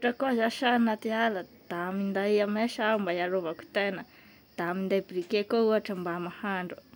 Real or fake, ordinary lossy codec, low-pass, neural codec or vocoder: real; none; none; none